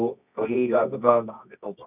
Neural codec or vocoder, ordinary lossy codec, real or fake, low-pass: codec, 24 kHz, 0.9 kbps, WavTokenizer, medium music audio release; none; fake; 3.6 kHz